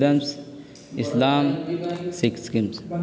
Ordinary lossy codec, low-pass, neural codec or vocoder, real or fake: none; none; none; real